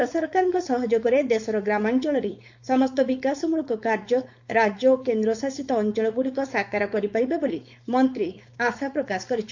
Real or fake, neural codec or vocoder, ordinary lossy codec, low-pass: fake; codec, 16 kHz, 4.8 kbps, FACodec; MP3, 48 kbps; 7.2 kHz